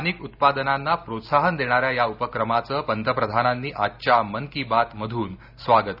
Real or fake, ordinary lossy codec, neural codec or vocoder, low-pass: real; none; none; 5.4 kHz